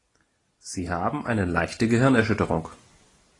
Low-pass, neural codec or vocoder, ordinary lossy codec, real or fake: 10.8 kHz; none; AAC, 32 kbps; real